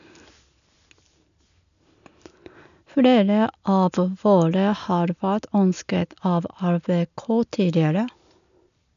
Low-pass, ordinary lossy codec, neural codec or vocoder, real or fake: 7.2 kHz; none; none; real